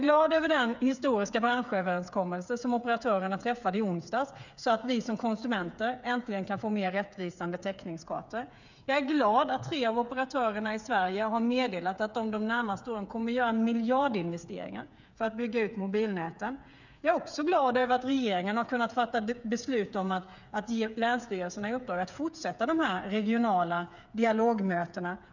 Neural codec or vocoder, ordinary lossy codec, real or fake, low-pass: codec, 16 kHz, 8 kbps, FreqCodec, smaller model; none; fake; 7.2 kHz